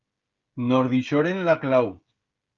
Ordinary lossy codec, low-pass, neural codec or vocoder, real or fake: Opus, 32 kbps; 7.2 kHz; codec, 16 kHz, 16 kbps, FreqCodec, smaller model; fake